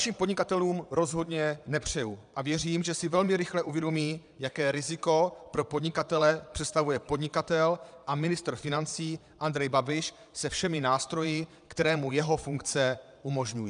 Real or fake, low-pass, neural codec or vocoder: fake; 9.9 kHz; vocoder, 22.05 kHz, 80 mel bands, Vocos